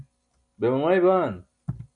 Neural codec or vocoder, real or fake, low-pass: none; real; 9.9 kHz